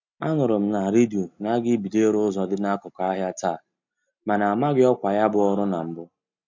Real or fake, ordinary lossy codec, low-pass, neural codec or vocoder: real; MP3, 64 kbps; 7.2 kHz; none